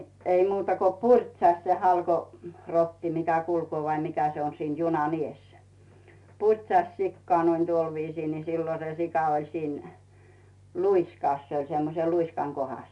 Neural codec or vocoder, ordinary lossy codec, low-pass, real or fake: none; AAC, 64 kbps; 10.8 kHz; real